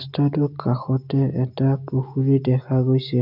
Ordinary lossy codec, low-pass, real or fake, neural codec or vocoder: none; 5.4 kHz; real; none